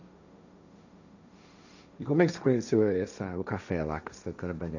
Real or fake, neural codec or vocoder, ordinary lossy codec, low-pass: fake; codec, 16 kHz, 1.1 kbps, Voila-Tokenizer; none; 7.2 kHz